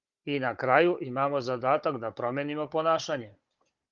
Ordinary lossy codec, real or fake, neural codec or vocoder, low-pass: Opus, 32 kbps; fake; codec, 16 kHz, 16 kbps, FunCodec, trained on Chinese and English, 50 frames a second; 7.2 kHz